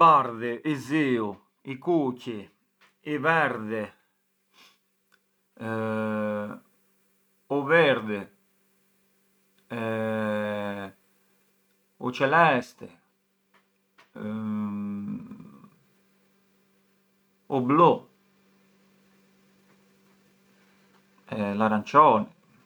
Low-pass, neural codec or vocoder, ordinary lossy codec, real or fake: none; none; none; real